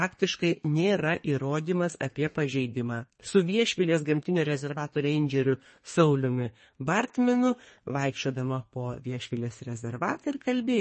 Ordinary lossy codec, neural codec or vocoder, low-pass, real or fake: MP3, 32 kbps; codec, 32 kHz, 1.9 kbps, SNAC; 10.8 kHz; fake